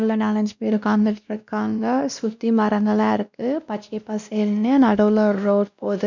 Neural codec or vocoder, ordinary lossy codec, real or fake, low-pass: codec, 16 kHz, 1 kbps, X-Codec, WavLM features, trained on Multilingual LibriSpeech; none; fake; 7.2 kHz